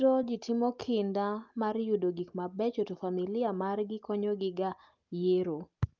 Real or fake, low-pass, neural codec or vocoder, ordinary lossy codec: real; 7.2 kHz; none; Opus, 24 kbps